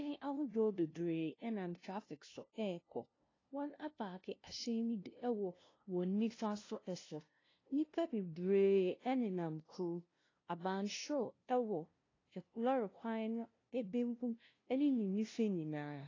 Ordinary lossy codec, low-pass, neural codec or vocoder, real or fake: AAC, 32 kbps; 7.2 kHz; codec, 16 kHz, 0.5 kbps, FunCodec, trained on LibriTTS, 25 frames a second; fake